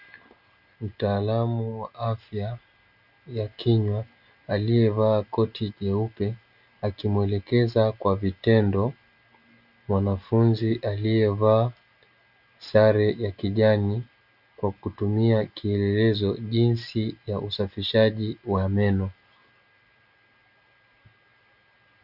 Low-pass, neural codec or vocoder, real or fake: 5.4 kHz; none; real